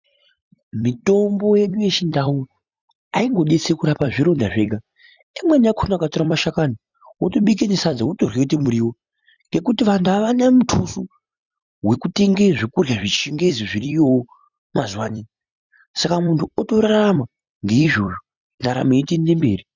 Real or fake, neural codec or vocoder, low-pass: fake; vocoder, 44.1 kHz, 128 mel bands every 256 samples, BigVGAN v2; 7.2 kHz